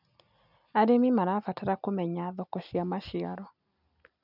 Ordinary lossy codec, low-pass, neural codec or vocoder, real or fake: none; 5.4 kHz; none; real